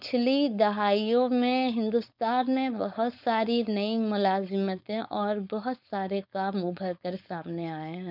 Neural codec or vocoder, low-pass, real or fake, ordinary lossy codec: codec, 16 kHz, 4.8 kbps, FACodec; 5.4 kHz; fake; none